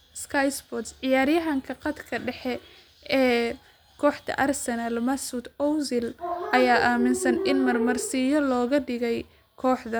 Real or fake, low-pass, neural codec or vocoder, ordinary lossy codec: real; none; none; none